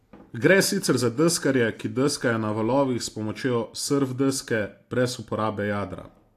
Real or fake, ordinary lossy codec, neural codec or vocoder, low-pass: real; AAC, 64 kbps; none; 14.4 kHz